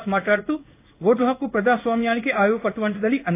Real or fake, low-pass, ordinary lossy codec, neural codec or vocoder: fake; 3.6 kHz; AAC, 24 kbps; codec, 16 kHz in and 24 kHz out, 1 kbps, XY-Tokenizer